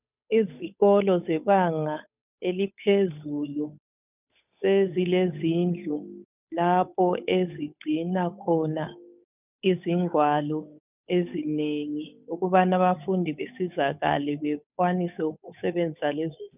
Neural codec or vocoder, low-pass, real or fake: codec, 16 kHz, 8 kbps, FunCodec, trained on Chinese and English, 25 frames a second; 3.6 kHz; fake